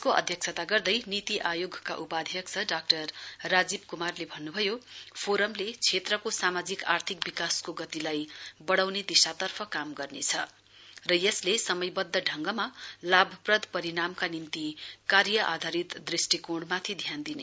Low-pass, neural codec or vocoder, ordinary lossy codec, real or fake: none; none; none; real